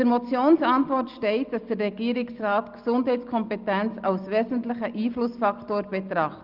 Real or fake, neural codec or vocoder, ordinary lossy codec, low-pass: real; none; Opus, 32 kbps; 5.4 kHz